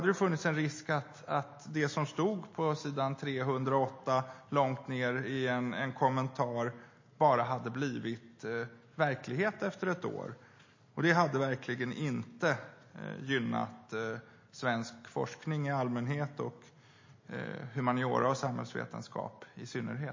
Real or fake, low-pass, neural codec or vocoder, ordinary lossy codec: real; 7.2 kHz; none; MP3, 32 kbps